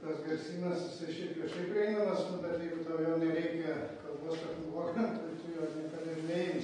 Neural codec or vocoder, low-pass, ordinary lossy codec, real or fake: none; 9.9 kHz; AAC, 32 kbps; real